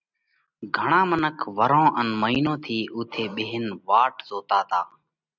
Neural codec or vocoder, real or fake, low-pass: none; real; 7.2 kHz